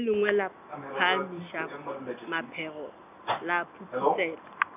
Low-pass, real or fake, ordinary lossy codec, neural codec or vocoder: 3.6 kHz; real; none; none